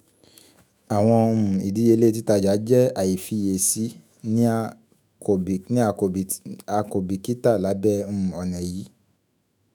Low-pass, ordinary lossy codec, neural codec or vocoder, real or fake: none; none; autoencoder, 48 kHz, 128 numbers a frame, DAC-VAE, trained on Japanese speech; fake